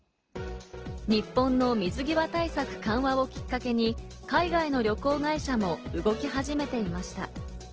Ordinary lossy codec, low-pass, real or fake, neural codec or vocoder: Opus, 16 kbps; 7.2 kHz; real; none